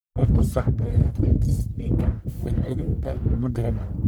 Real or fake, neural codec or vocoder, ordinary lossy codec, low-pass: fake; codec, 44.1 kHz, 1.7 kbps, Pupu-Codec; none; none